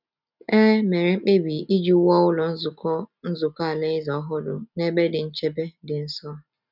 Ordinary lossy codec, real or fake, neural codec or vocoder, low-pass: none; real; none; 5.4 kHz